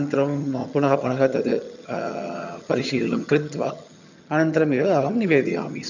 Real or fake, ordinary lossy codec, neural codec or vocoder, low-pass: fake; none; vocoder, 22.05 kHz, 80 mel bands, HiFi-GAN; 7.2 kHz